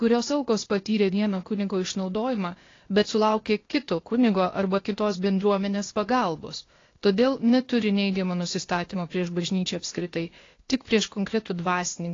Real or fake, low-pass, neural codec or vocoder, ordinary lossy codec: fake; 7.2 kHz; codec, 16 kHz, 0.8 kbps, ZipCodec; AAC, 32 kbps